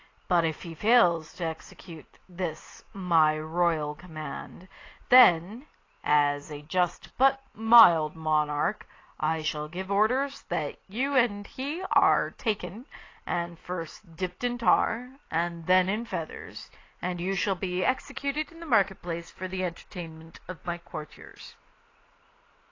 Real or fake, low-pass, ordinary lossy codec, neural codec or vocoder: real; 7.2 kHz; AAC, 32 kbps; none